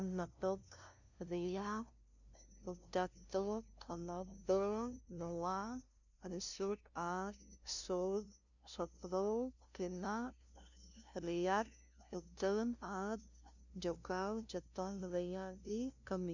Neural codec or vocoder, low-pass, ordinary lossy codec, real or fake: codec, 16 kHz, 0.5 kbps, FunCodec, trained on LibriTTS, 25 frames a second; 7.2 kHz; none; fake